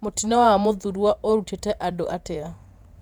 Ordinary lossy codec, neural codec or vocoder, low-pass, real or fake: none; vocoder, 48 kHz, 128 mel bands, Vocos; 19.8 kHz; fake